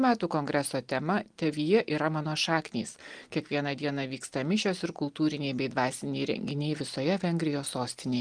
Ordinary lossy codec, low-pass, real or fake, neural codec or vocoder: Opus, 24 kbps; 9.9 kHz; real; none